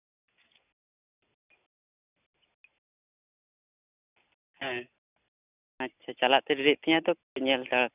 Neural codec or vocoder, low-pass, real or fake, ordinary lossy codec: none; 3.6 kHz; real; none